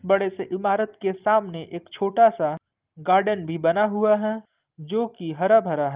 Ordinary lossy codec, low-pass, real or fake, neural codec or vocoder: Opus, 32 kbps; 3.6 kHz; real; none